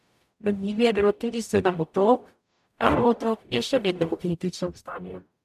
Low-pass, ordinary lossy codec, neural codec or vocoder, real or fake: 14.4 kHz; none; codec, 44.1 kHz, 0.9 kbps, DAC; fake